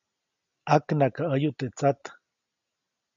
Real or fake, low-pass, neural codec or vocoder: real; 7.2 kHz; none